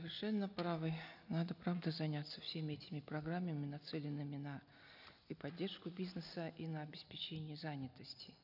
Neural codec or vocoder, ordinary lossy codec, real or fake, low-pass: none; none; real; 5.4 kHz